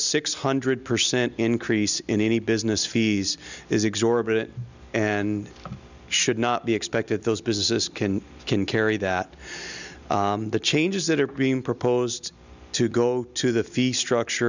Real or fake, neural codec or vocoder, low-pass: real; none; 7.2 kHz